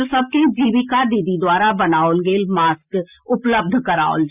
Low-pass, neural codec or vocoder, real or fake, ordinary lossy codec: 3.6 kHz; none; real; Opus, 64 kbps